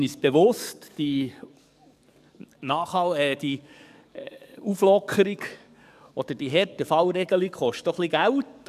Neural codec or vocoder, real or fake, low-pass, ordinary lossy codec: codec, 44.1 kHz, 7.8 kbps, DAC; fake; 14.4 kHz; none